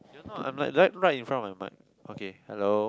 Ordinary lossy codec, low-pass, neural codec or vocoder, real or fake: none; none; none; real